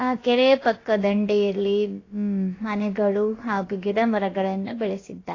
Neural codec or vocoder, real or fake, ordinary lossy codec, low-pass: codec, 16 kHz, about 1 kbps, DyCAST, with the encoder's durations; fake; AAC, 32 kbps; 7.2 kHz